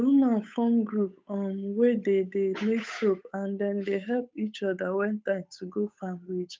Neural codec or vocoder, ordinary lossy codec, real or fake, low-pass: codec, 16 kHz, 8 kbps, FunCodec, trained on Chinese and English, 25 frames a second; none; fake; none